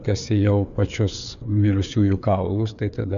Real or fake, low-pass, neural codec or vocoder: fake; 7.2 kHz; codec, 16 kHz, 4 kbps, FreqCodec, larger model